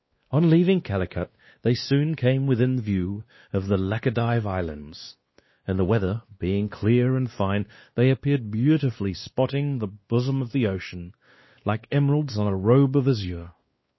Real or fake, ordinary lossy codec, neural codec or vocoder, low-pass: fake; MP3, 24 kbps; codec, 16 kHz, 2 kbps, X-Codec, WavLM features, trained on Multilingual LibriSpeech; 7.2 kHz